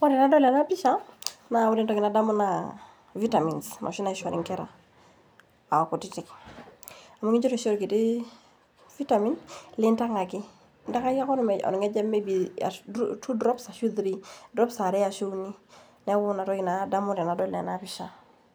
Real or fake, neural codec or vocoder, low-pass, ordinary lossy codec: real; none; none; none